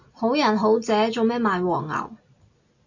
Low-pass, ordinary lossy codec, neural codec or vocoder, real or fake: 7.2 kHz; AAC, 48 kbps; none; real